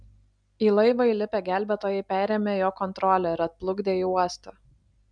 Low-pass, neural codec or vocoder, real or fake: 9.9 kHz; none; real